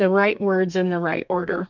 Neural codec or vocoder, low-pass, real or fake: codec, 32 kHz, 1.9 kbps, SNAC; 7.2 kHz; fake